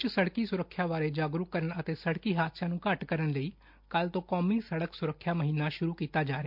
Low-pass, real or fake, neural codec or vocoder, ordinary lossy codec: 5.4 kHz; real; none; MP3, 48 kbps